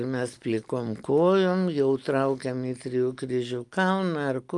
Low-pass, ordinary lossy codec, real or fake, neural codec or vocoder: 10.8 kHz; Opus, 32 kbps; real; none